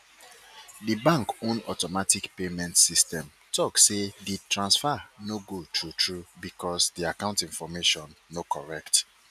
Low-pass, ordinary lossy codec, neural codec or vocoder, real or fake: 14.4 kHz; none; none; real